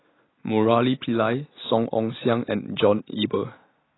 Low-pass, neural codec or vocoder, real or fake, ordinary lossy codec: 7.2 kHz; none; real; AAC, 16 kbps